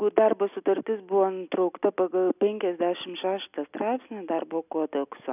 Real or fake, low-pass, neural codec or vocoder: real; 3.6 kHz; none